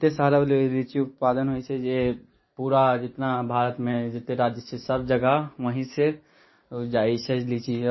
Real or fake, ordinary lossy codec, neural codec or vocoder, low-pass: real; MP3, 24 kbps; none; 7.2 kHz